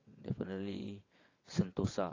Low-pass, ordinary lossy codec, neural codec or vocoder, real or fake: 7.2 kHz; AAC, 32 kbps; vocoder, 22.05 kHz, 80 mel bands, WaveNeXt; fake